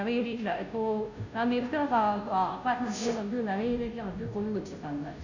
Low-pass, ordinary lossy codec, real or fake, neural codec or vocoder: 7.2 kHz; none; fake; codec, 16 kHz, 0.5 kbps, FunCodec, trained on Chinese and English, 25 frames a second